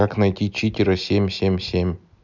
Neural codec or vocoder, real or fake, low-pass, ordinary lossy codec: none; real; 7.2 kHz; none